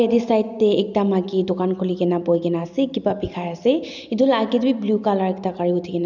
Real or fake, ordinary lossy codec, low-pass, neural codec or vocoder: real; none; 7.2 kHz; none